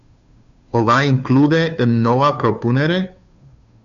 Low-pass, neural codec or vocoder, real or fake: 7.2 kHz; codec, 16 kHz, 2 kbps, FunCodec, trained on Chinese and English, 25 frames a second; fake